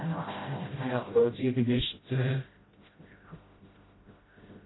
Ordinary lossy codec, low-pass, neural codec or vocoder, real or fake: AAC, 16 kbps; 7.2 kHz; codec, 16 kHz, 0.5 kbps, FreqCodec, smaller model; fake